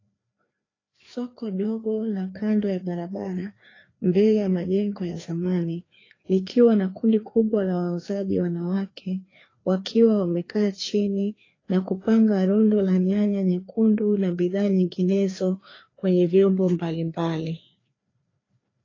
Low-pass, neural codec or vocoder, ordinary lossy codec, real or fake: 7.2 kHz; codec, 16 kHz, 2 kbps, FreqCodec, larger model; AAC, 32 kbps; fake